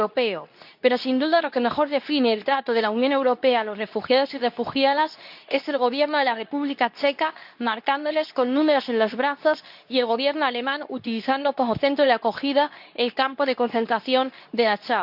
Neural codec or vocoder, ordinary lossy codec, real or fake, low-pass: codec, 24 kHz, 0.9 kbps, WavTokenizer, medium speech release version 2; none; fake; 5.4 kHz